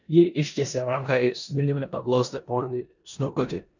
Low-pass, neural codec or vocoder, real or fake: 7.2 kHz; codec, 16 kHz in and 24 kHz out, 0.9 kbps, LongCat-Audio-Codec, four codebook decoder; fake